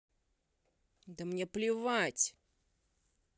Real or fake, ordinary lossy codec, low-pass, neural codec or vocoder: real; none; none; none